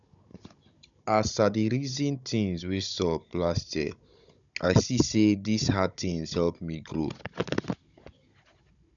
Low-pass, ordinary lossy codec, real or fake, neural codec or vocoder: 7.2 kHz; none; fake; codec, 16 kHz, 16 kbps, FunCodec, trained on Chinese and English, 50 frames a second